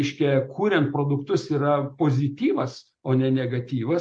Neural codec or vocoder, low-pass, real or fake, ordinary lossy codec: none; 9.9 kHz; real; MP3, 48 kbps